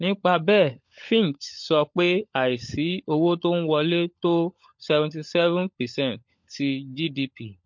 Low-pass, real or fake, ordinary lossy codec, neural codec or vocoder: 7.2 kHz; fake; MP3, 48 kbps; codec, 16 kHz, 16 kbps, FunCodec, trained on LibriTTS, 50 frames a second